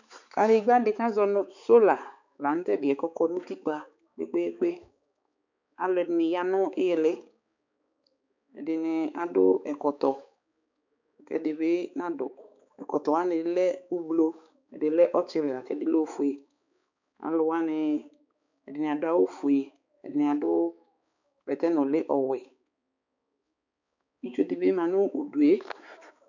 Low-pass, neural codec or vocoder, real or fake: 7.2 kHz; codec, 16 kHz, 4 kbps, X-Codec, HuBERT features, trained on balanced general audio; fake